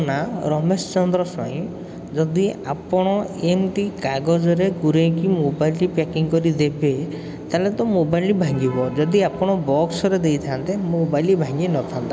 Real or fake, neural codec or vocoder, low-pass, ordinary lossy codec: real; none; none; none